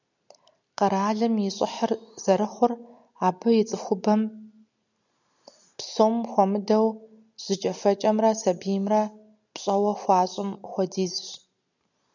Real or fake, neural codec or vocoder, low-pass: real; none; 7.2 kHz